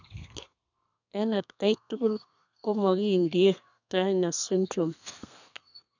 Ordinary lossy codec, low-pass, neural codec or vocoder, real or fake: none; 7.2 kHz; codec, 32 kHz, 1.9 kbps, SNAC; fake